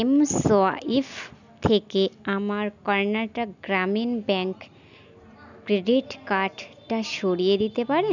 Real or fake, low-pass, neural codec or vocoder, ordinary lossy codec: real; 7.2 kHz; none; none